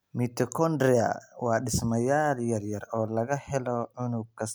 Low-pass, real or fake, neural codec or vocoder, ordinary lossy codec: none; real; none; none